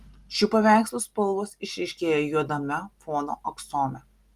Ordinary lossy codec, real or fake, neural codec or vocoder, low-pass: Opus, 32 kbps; real; none; 14.4 kHz